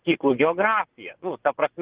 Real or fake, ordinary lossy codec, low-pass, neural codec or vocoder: fake; Opus, 16 kbps; 3.6 kHz; vocoder, 24 kHz, 100 mel bands, Vocos